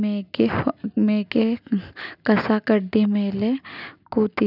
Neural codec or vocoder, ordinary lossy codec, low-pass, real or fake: none; none; 5.4 kHz; real